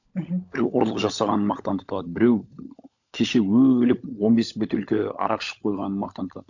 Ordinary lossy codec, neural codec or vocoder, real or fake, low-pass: none; codec, 16 kHz, 16 kbps, FunCodec, trained on LibriTTS, 50 frames a second; fake; 7.2 kHz